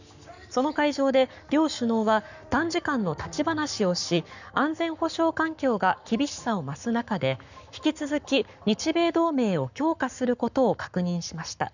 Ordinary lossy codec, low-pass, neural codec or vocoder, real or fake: none; 7.2 kHz; codec, 44.1 kHz, 7.8 kbps, Pupu-Codec; fake